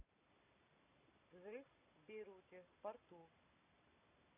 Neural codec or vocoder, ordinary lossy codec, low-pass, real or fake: none; none; 3.6 kHz; real